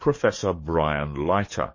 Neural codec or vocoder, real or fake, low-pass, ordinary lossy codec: codec, 44.1 kHz, 7.8 kbps, DAC; fake; 7.2 kHz; MP3, 32 kbps